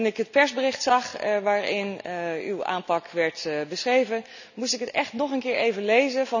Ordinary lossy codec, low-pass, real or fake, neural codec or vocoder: none; 7.2 kHz; real; none